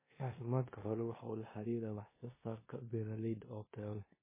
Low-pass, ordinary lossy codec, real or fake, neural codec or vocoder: 3.6 kHz; MP3, 16 kbps; fake; codec, 16 kHz in and 24 kHz out, 0.9 kbps, LongCat-Audio-Codec, four codebook decoder